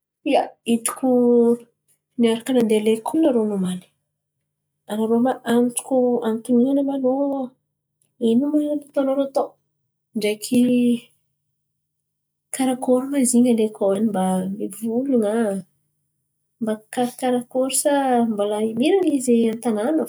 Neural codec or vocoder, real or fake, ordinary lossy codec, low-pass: none; real; none; none